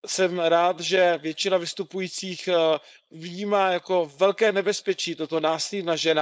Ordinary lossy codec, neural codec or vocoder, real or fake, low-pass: none; codec, 16 kHz, 4.8 kbps, FACodec; fake; none